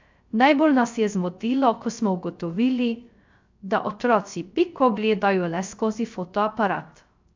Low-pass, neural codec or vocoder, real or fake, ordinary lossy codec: 7.2 kHz; codec, 16 kHz, 0.3 kbps, FocalCodec; fake; MP3, 64 kbps